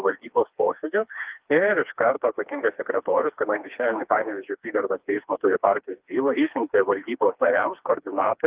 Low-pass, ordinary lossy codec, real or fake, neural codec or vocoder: 3.6 kHz; Opus, 32 kbps; fake; codec, 16 kHz, 2 kbps, FreqCodec, smaller model